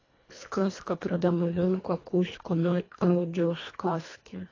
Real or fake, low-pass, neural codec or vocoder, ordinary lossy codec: fake; 7.2 kHz; codec, 24 kHz, 1.5 kbps, HILCodec; AAC, 32 kbps